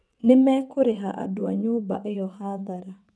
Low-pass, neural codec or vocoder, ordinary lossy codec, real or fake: none; vocoder, 22.05 kHz, 80 mel bands, WaveNeXt; none; fake